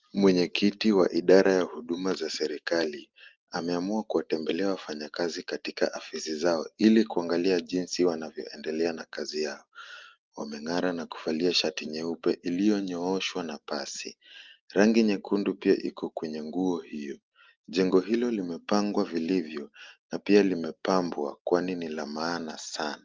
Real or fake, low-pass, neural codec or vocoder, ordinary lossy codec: real; 7.2 kHz; none; Opus, 32 kbps